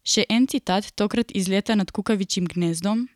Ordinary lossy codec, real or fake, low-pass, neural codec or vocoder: none; real; 19.8 kHz; none